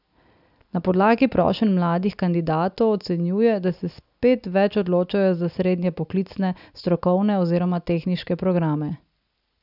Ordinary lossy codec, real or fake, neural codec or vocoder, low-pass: none; real; none; 5.4 kHz